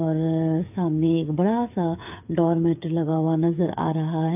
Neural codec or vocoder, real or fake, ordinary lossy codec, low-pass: codec, 16 kHz, 8 kbps, FreqCodec, smaller model; fake; AAC, 32 kbps; 3.6 kHz